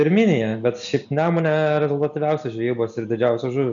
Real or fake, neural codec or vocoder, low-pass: real; none; 7.2 kHz